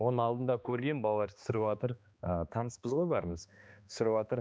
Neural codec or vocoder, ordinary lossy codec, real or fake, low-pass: codec, 16 kHz, 2 kbps, X-Codec, HuBERT features, trained on balanced general audio; none; fake; none